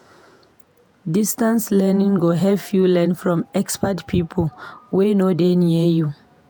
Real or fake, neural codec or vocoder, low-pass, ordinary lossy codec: fake; vocoder, 48 kHz, 128 mel bands, Vocos; none; none